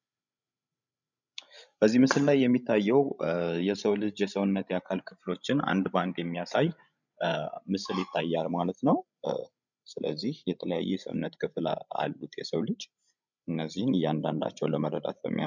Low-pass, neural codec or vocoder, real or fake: 7.2 kHz; codec, 16 kHz, 16 kbps, FreqCodec, larger model; fake